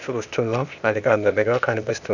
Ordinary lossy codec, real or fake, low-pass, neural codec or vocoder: none; fake; 7.2 kHz; codec, 16 kHz, 0.8 kbps, ZipCodec